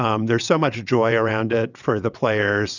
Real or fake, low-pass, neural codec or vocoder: fake; 7.2 kHz; vocoder, 22.05 kHz, 80 mel bands, WaveNeXt